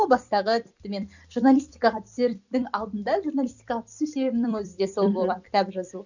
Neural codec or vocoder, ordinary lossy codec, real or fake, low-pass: vocoder, 44.1 kHz, 128 mel bands every 256 samples, BigVGAN v2; none; fake; 7.2 kHz